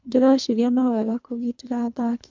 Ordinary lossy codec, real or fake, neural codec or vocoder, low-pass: MP3, 64 kbps; fake; codec, 24 kHz, 3 kbps, HILCodec; 7.2 kHz